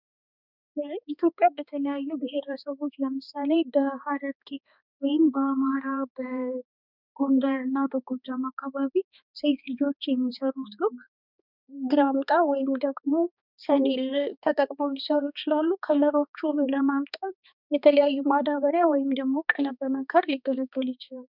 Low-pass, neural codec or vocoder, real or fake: 5.4 kHz; codec, 16 kHz, 2 kbps, X-Codec, HuBERT features, trained on balanced general audio; fake